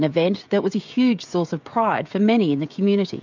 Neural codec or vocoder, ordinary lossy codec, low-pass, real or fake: codec, 16 kHz, 16 kbps, FreqCodec, smaller model; MP3, 64 kbps; 7.2 kHz; fake